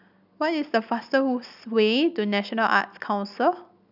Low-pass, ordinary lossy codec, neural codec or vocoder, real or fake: 5.4 kHz; none; none; real